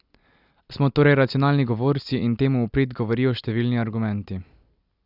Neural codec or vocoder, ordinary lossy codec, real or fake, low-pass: none; Opus, 64 kbps; real; 5.4 kHz